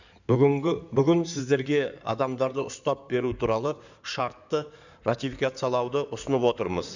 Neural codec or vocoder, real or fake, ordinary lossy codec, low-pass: codec, 16 kHz in and 24 kHz out, 2.2 kbps, FireRedTTS-2 codec; fake; none; 7.2 kHz